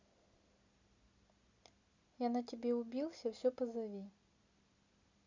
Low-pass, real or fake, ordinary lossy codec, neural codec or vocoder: 7.2 kHz; real; Opus, 64 kbps; none